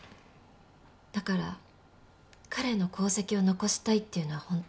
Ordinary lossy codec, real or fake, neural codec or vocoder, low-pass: none; real; none; none